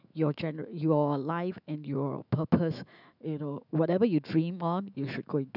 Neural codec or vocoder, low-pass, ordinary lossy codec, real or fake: autoencoder, 48 kHz, 128 numbers a frame, DAC-VAE, trained on Japanese speech; 5.4 kHz; none; fake